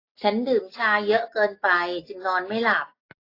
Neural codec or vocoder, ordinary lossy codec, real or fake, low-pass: none; AAC, 32 kbps; real; 5.4 kHz